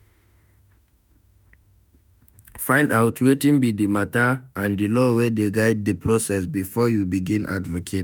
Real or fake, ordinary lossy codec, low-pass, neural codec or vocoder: fake; none; none; autoencoder, 48 kHz, 32 numbers a frame, DAC-VAE, trained on Japanese speech